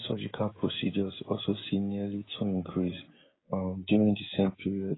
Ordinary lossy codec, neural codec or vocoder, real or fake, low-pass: AAC, 16 kbps; codec, 16 kHz, 16 kbps, FreqCodec, smaller model; fake; 7.2 kHz